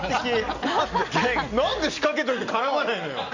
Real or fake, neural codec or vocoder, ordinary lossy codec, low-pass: real; none; Opus, 64 kbps; 7.2 kHz